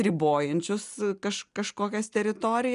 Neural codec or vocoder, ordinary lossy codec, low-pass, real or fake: none; MP3, 96 kbps; 10.8 kHz; real